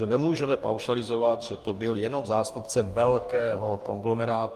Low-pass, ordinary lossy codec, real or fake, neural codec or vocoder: 14.4 kHz; Opus, 32 kbps; fake; codec, 44.1 kHz, 2.6 kbps, DAC